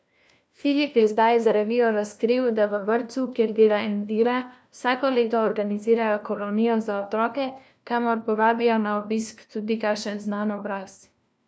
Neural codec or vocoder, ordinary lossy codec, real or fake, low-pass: codec, 16 kHz, 1 kbps, FunCodec, trained on LibriTTS, 50 frames a second; none; fake; none